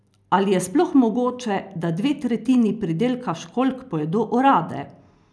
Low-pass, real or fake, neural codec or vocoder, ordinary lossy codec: none; real; none; none